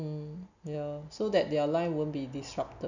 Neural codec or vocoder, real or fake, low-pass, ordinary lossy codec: none; real; 7.2 kHz; none